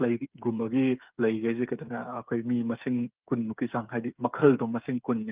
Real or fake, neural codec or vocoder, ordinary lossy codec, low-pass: fake; codec, 16 kHz, 8 kbps, FreqCodec, smaller model; Opus, 24 kbps; 3.6 kHz